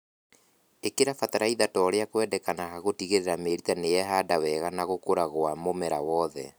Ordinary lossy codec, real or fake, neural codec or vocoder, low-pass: none; real; none; none